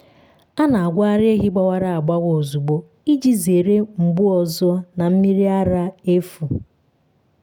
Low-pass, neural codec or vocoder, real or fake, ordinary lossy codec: none; none; real; none